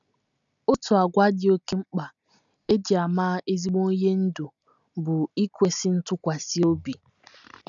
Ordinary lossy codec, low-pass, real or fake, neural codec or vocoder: none; 7.2 kHz; real; none